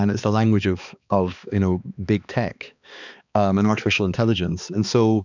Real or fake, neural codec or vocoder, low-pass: fake; codec, 16 kHz, 2 kbps, X-Codec, HuBERT features, trained on balanced general audio; 7.2 kHz